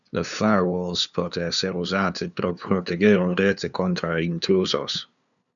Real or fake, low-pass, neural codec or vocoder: fake; 7.2 kHz; codec, 16 kHz, 2 kbps, FunCodec, trained on LibriTTS, 25 frames a second